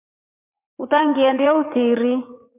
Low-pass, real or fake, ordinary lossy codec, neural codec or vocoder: 3.6 kHz; fake; MP3, 32 kbps; vocoder, 44.1 kHz, 80 mel bands, Vocos